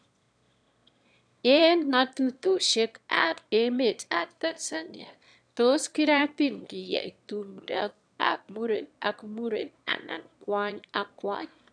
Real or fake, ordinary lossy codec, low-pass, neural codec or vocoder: fake; none; 9.9 kHz; autoencoder, 22.05 kHz, a latent of 192 numbers a frame, VITS, trained on one speaker